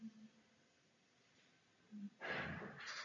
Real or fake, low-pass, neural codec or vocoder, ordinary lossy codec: real; 7.2 kHz; none; MP3, 96 kbps